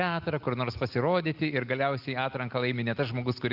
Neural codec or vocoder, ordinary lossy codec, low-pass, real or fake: none; Opus, 32 kbps; 5.4 kHz; real